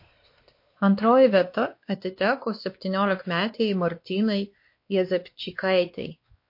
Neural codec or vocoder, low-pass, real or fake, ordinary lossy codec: codec, 16 kHz, 2 kbps, X-Codec, WavLM features, trained on Multilingual LibriSpeech; 5.4 kHz; fake; MP3, 32 kbps